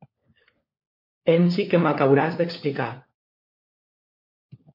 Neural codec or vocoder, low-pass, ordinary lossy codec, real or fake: codec, 16 kHz, 4 kbps, FunCodec, trained on LibriTTS, 50 frames a second; 5.4 kHz; MP3, 32 kbps; fake